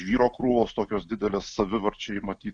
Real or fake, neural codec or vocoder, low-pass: real; none; 9.9 kHz